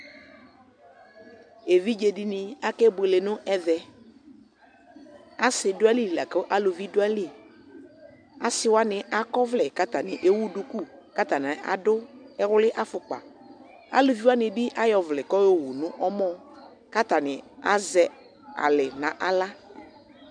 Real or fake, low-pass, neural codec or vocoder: real; 9.9 kHz; none